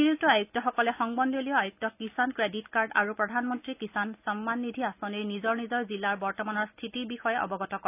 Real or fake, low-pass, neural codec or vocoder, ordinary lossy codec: real; 3.6 kHz; none; none